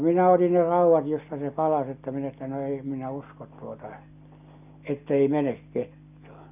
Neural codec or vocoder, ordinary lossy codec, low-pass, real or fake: none; none; 3.6 kHz; real